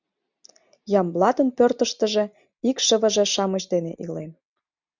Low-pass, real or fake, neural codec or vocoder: 7.2 kHz; real; none